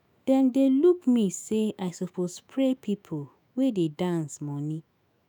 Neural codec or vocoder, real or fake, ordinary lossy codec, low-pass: autoencoder, 48 kHz, 128 numbers a frame, DAC-VAE, trained on Japanese speech; fake; none; none